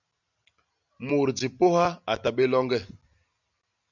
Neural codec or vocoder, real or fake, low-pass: none; real; 7.2 kHz